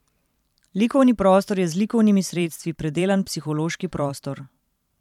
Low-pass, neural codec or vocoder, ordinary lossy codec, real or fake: 19.8 kHz; vocoder, 44.1 kHz, 128 mel bands every 512 samples, BigVGAN v2; none; fake